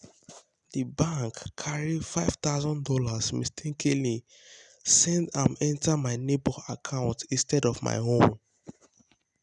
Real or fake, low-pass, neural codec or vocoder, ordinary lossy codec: real; 10.8 kHz; none; none